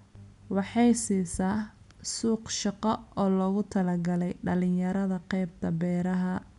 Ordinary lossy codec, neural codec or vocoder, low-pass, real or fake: MP3, 96 kbps; none; 10.8 kHz; real